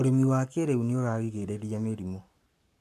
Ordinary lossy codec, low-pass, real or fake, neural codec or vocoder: MP3, 96 kbps; 14.4 kHz; fake; codec, 44.1 kHz, 7.8 kbps, Pupu-Codec